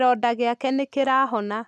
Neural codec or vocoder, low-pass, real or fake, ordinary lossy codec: none; none; real; none